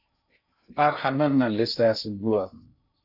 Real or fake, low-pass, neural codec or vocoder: fake; 5.4 kHz; codec, 16 kHz in and 24 kHz out, 0.6 kbps, FocalCodec, streaming, 2048 codes